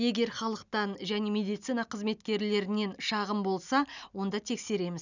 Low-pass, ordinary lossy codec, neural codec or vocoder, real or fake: 7.2 kHz; none; none; real